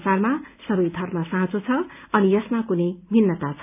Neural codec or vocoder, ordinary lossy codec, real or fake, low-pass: none; none; real; 3.6 kHz